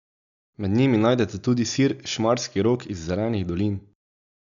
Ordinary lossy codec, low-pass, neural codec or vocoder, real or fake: none; 7.2 kHz; none; real